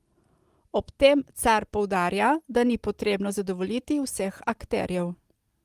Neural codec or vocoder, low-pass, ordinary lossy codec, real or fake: none; 14.4 kHz; Opus, 24 kbps; real